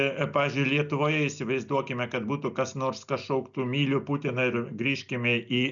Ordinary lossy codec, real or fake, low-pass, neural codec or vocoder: MP3, 96 kbps; real; 7.2 kHz; none